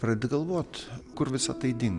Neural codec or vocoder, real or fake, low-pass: none; real; 10.8 kHz